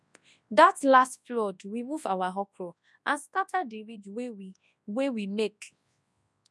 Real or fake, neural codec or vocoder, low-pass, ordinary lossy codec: fake; codec, 24 kHz, 0.9 kbps, WavTokenizer, large speech release; none; none